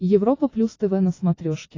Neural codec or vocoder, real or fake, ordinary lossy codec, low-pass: none; real; AAC, 32 kbps; 7.2 kHz